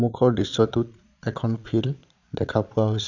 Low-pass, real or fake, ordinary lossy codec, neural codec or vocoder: 7.2 kHz; fake; none; vocoder, 22.05 kHz, 80 mel bands, Vocos